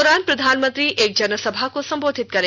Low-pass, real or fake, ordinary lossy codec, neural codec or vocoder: none; real; none; none